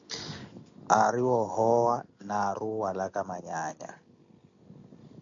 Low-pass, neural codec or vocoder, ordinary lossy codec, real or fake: 7.2 kHz; none; AAC, 64 kbps; real